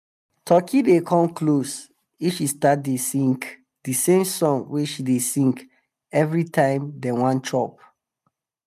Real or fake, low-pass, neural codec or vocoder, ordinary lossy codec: real; 14.4 kHz; none; none